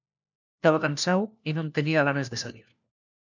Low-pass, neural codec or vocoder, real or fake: 7.2 kHz; codec, 16 kHz, 1 kbps, FunCodec, trained on LibriTTS, 50 frames a second; fake